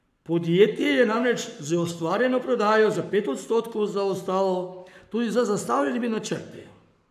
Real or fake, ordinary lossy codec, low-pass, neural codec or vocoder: fake; none; 14.4 kHz; codec, 44.1 kHz, 7.8 kbps, Pupu-Codec